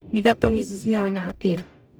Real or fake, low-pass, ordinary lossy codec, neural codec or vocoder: fake; none; none; codec, 44.1 kHz, 0.9 kbps, DAC